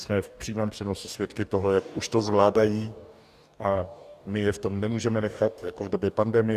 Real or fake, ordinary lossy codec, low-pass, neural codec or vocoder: fake; Opus, 64 kbps; 14.4 kHz; codec, 44.1 kHz, 2.6 kbps, DAC